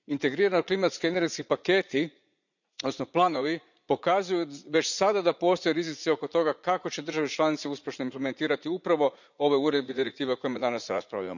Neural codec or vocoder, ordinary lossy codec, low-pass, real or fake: vocoder, 44.1 kHz, 80 mel bands, Vocos; none; 7.2 kHz; fake